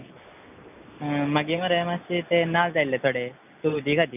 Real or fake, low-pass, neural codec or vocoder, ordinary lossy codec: real; 3.6 kHz; none; none